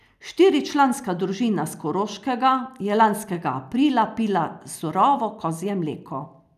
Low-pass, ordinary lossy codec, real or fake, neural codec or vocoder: 14.4 kHz; none; real; none